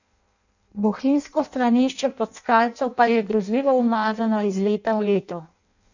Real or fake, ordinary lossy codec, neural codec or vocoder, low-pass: fake; none; codec, 16 kHz in and 24 kHz out, 0.6 kbps, FireRedTTS-2 codec; 7.2 kHz